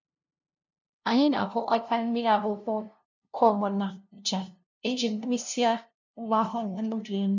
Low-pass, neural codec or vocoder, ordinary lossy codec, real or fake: 7.2 kHz; codec, 16 kHz, 0.5 kbps, FunCodec, trained on LibriTTS, 25 frames a second; none; fake